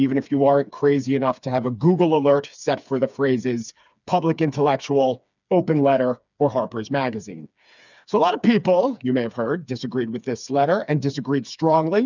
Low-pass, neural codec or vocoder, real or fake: 7.2 kHz; codec, 16 kHz, 4 kbps, FreqCodec, smaller model; fake